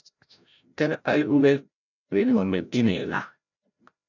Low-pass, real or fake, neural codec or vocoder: 7.2 kHz; fake; codec, 16 kHz, 0.5 kbps, FreqCodec, larger model